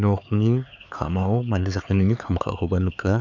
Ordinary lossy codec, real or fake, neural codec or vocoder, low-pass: none; fake; codec, 16 kHz, 4 kbps, X-Codec, HuBERT features, trained on LibriSpeech; 7.2 kHz